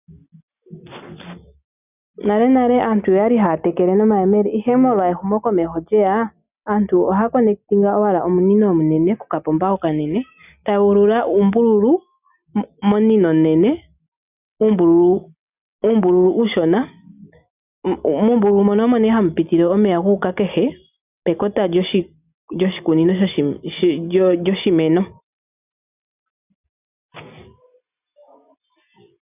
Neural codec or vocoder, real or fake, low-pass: none; real; 3.6 kHz